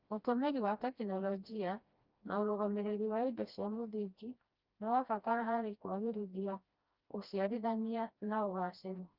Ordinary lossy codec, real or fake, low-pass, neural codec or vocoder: Opus, 32 kbps; fake; 5.4 kHz; codec, 16 kHz, 1 kbps, FreqCodec, smaller model